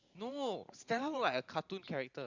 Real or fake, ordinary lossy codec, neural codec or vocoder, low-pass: fake; Opus, 64 kbps; vocoder, 44.1 kHz, 128 mel bands every 512 samples, BigVGAN v2; 7.2 kHz